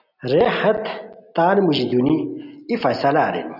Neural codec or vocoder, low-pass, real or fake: none; 5.4 kHz; real